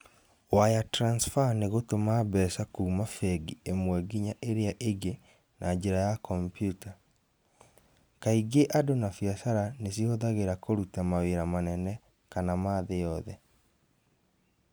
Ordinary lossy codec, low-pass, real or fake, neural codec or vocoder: none; none; real; none